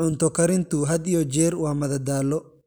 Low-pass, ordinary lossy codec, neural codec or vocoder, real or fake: none; none; none; real